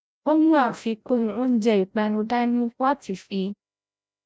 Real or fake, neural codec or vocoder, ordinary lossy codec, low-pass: fake; codec, 16 kHz, 0.5 kbps, FreqCodec, larger model; none; none